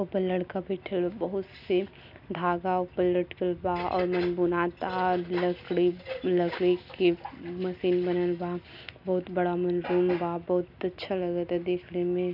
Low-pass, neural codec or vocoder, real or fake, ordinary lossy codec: 5.4 kHz; none; real; none